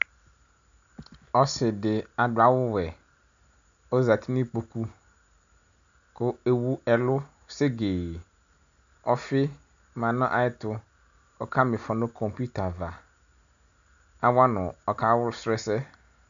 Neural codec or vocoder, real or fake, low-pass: none; real; 7.2 kHz